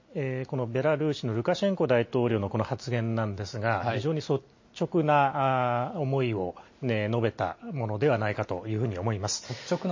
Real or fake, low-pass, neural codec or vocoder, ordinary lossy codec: real; 7.2 kHz; none; MP3, 32 kbps